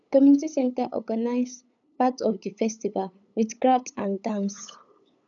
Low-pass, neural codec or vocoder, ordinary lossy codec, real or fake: 7.2 kHz; codec, 16 kHz, 8 kbps, FunCodec, trained on LibriTTS, 25 frames a second; none; fake